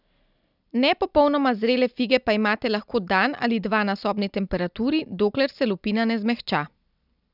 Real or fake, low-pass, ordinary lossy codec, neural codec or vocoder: real; 5.4 kHz; none; none